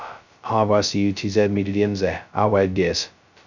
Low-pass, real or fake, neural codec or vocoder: 7.2 kHz; fake; codec, 16 kHz, 0.2 kbps, FocalCodec